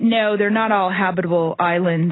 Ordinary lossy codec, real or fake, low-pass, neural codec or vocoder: AAC, 16 kbps; real; 7.2 kHz; none